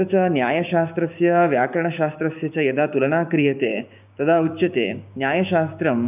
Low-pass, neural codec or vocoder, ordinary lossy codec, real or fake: 3.6 kHz; codec, 16 kHz, 6 kbps, DAC; none; fake